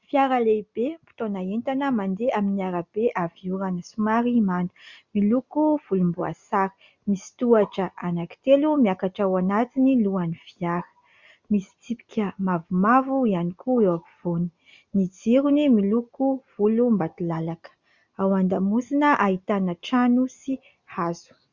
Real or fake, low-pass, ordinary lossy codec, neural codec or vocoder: real; 7.2 kHz; Opus, 64 kbps; none